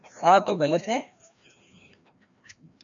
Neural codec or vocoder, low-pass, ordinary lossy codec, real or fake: codec, 16 kHz, 1 kbps, FreqCodec, larger model; 7.2 kHz; MP3, 64 kbps; fake